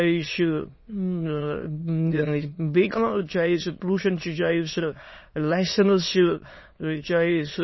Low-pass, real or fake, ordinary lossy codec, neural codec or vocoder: 7.2 kHz; fake; MP3, 24 kbps; autoencoder, 22.05 kHz, a latent of 192 numbers a frame, VITS, trained on many speakers